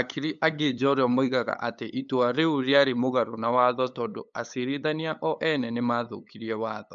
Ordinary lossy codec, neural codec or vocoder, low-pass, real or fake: MP3, 64 kbps; codec, 16 kHz, 8 kbps, FunCodec, trained on LibriTTS, 25 frames a second; 7.2 kHz; fake